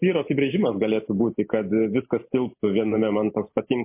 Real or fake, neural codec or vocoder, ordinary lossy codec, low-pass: real; none; MP3, 24 kbps; 3.6 kHz